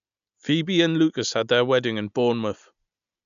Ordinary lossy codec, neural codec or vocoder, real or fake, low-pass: none; none; real; 7.2 kHz